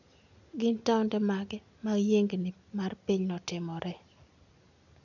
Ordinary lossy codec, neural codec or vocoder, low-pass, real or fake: none; none; 7.2 kHz; real